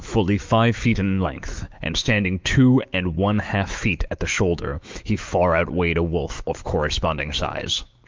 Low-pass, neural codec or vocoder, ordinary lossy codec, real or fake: 7.2 kHz; autoencoder, 48 kHz, 128 numbers a frame, DAC-VAE, trained on Japanese speech; Opus, 32 kbps; fake